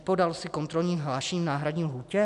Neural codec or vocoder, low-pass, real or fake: none; 10.8 kHz; real